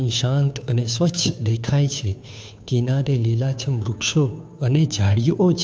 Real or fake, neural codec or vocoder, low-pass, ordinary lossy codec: fake; codec, 16 kHz, 2 kbps, FunCodec, trained on Chinese and English, 25 frames a second; none; none